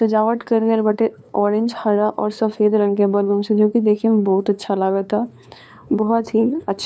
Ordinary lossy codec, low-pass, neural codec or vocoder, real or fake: none; none; codec, 16 kHz, 2 kbps, FunCodec, trained on LibriTTS, 25 frames a second; fake